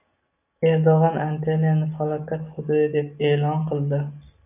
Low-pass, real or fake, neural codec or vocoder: 3.6 kHz; real; none